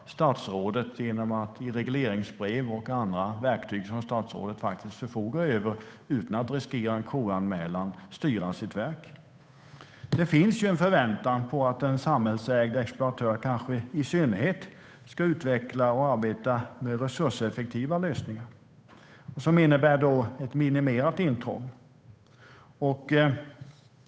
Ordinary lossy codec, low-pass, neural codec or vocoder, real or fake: none; none; codec, 16 kHz, 8 kbps, FunCodec, trained on Chinese and English, 25 frames a second; fake